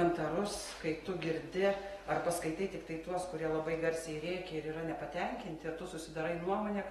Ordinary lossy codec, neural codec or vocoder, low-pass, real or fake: AAC, 32 kbps; none; 19.8 kHz; real